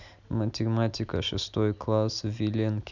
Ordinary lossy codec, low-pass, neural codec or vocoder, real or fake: none; 7.2 kHz; none; real